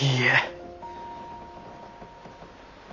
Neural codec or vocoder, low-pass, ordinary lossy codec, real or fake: none; 7.2 kHz; none; real